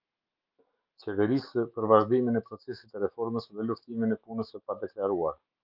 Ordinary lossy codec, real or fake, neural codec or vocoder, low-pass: Opus, 24 kbps; fake; autoencoder, 48 kHz, 128 numbers a frame, DAC-VAE, trained on Japanese speech; 5.4 kHz